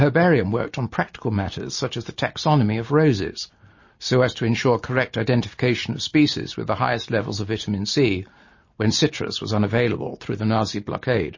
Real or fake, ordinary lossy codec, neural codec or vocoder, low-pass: real; MP3, 32 kbps; none; 7.2 kHz